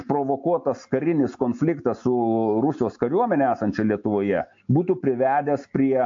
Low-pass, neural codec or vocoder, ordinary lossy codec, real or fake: 7.2 kHz; none; AAC, 48 kbps; real